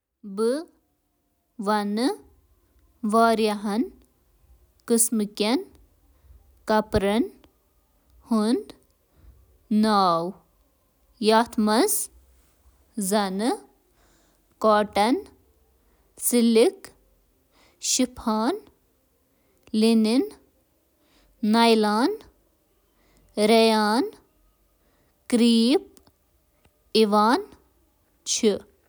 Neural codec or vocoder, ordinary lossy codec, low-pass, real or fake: none; none; none; real